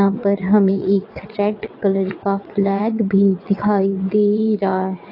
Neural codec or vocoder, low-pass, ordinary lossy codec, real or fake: vocoder, 22.05 kHz, 80 mel bands, WaveNeXt; 5.4 kHz; AAC, 32 kbps; fake